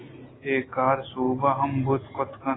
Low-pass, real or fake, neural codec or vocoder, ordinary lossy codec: 7.2 kHz; real; none; AAC, 16 kbps